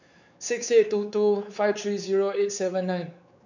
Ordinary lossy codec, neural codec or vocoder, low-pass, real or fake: none; codec, 16 kHz, 4 kbps, X-Codec, WavLM features, trained on Multilingual LibriSpeech; 7.2 kHz; fake